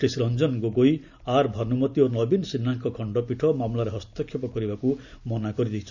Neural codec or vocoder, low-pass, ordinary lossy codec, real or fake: none; 7.2 kHz; none; real